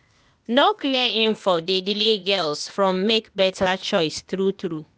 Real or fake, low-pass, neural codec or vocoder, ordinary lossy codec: fake; none; codec, 16 kHz, 0.8 kbps, ZipCodec; none